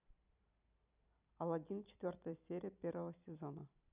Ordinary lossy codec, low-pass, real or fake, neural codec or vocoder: none; 3.6 kHz; fake; vocoder, 44.1 kHz, 128 mel bands every 256 samples, BigVGAN v2